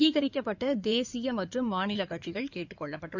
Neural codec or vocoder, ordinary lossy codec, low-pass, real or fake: codec, 16 kHz in and 24 kHz out, 2.2 kbps, FireRedTTS-2 codec; none; 7.2 kHz; fake